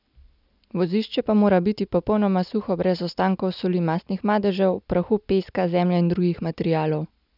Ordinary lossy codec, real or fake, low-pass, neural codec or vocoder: none; real; 5.4 kHz; none